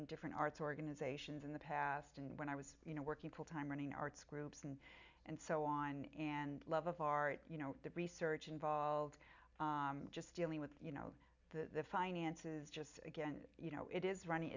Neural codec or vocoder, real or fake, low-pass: none; real; 7.2 kHz